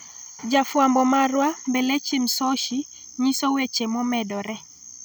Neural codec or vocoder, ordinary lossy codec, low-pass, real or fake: none; none; none; real